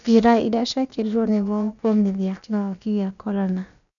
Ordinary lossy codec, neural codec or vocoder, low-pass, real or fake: none; codec, 16 kHz, about 1 kbps, DyCAST, with the encoder's durations; 7.2 kHz; fake